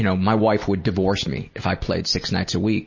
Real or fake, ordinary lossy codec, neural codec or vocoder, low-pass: real; MP3, 32 kbps; none; 7.2 kHz